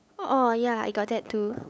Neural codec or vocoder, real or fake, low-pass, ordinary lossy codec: codec, 16 kHz, 8 kbps, FunCodec, trained on LibriTTS, 25 frames a second; fake; none; none